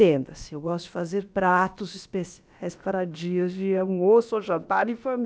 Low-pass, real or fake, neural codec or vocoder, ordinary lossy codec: none; fake; codec, 16 kHz, about 1 kbps, DyCAST, with the encoder's durations; none